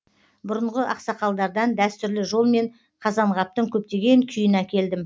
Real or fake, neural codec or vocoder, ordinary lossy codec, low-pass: real; none; none; none